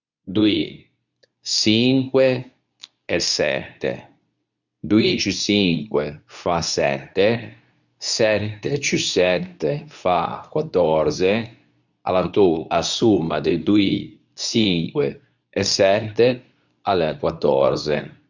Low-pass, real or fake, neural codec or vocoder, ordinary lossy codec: 7.2 kHz; fake; codec, 24 kHz, 0.9 kbps, WavTokenizer, medium speech release version 2; none